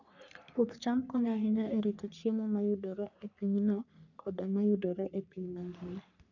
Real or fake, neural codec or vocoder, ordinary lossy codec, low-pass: fake; codec, 44.1 kHz, 3.4 kbps, Pupu-Codec; none; 7.2 kHz